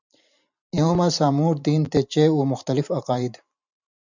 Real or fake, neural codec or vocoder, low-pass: fake; vocoder, 44.1 kHz, 128 mel bands every 512 samples, BigVGAN v2; 7.2 kHz